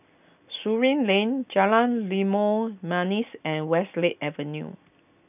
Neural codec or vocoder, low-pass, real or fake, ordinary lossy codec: none; 3.6 kHz; real; none